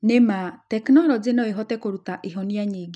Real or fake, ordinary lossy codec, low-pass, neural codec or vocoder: real; none; none; none